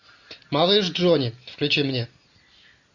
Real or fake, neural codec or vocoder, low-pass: real; none; 7.2 kHz